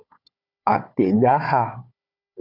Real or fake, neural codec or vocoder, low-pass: fake; codec, 16 kHz, 4 kbps, FunCodec, trained on Chinese and English, 50 frames a second; 5.4 kHz